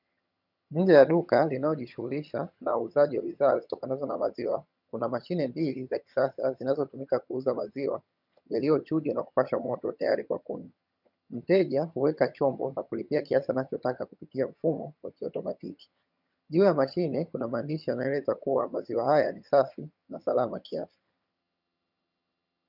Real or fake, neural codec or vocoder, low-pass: fake; vocoder, 22.05 kHz, 80 mel bands, HiFi-GAN; 5.4 kHz